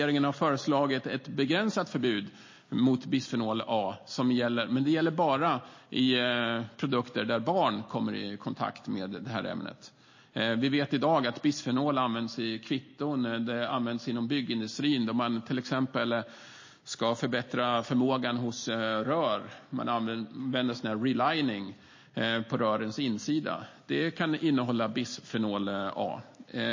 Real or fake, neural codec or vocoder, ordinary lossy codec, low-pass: real; none; MP3, 32 kbps; 7.2 kHz